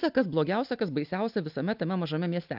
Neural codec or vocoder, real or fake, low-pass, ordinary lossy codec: none; real; 5.4 kHz; AAC, 48 kbps